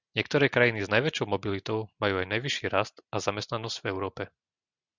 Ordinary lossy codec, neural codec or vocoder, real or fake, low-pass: Opus, 64 kbps; none; real; 7.2 kHz